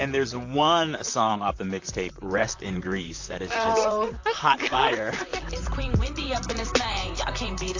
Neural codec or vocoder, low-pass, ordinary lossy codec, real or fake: vocoder, 44.1 kHz, 128 mel bands, Pupu-Vocoder; 7.2 kHz; AAC, 48 kbps; fake